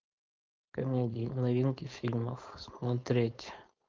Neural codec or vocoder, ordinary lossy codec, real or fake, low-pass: codec, 16 kHz, 4.8 kbps, FACodec; Opus, 24 kbps; fake; 7.2 kHz